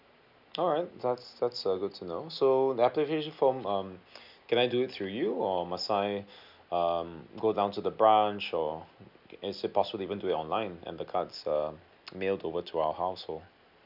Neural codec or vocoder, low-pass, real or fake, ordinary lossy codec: none; 5.4 kHz; real; none